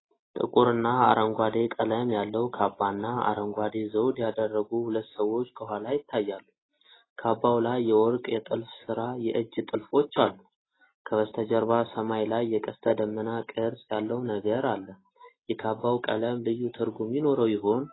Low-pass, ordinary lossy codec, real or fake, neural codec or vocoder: 7.2 kHz; AAC, 16 kbps; real; none